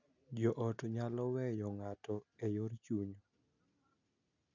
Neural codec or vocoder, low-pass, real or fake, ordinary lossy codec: none; 7.2 kHz; real; none